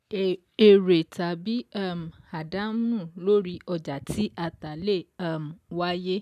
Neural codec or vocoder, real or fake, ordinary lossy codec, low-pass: none; real; none; 14.4 kHz